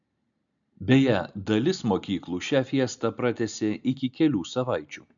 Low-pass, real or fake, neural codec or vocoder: 7.2 kHz; real; none